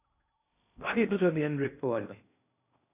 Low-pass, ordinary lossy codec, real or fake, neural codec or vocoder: 3.6 kHz; AAC, 24 kbps; fake; codec, 16 kHz in and 24 kHz out, 0.6 kbps, FocalCodec, streaming, 2048 codes